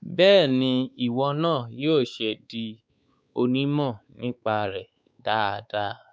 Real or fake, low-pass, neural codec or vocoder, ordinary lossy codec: fake; none; codec, 16 kHz, 4 kbps, X-Codec, WavLM features, trained on Multilingual LibriSpeech; none